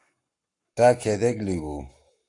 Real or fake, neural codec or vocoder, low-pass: fake; codec, 44.1 kHz, 7.8 kbps, Pupu-Codec; 10.8 kHz